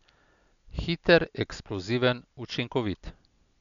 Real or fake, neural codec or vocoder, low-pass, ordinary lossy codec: real; none; 7.2 kHz; none